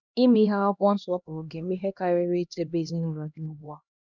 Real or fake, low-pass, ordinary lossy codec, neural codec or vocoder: fake; 7.2 kHz; none; codec, 16 kHz, 1 kbps, X-Codec, HuBERT features, trained on LibriSpeech